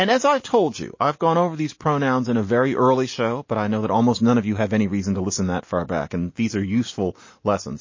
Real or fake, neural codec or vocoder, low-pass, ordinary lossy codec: real; none; 7.2 kHz; MP3, 32 kbps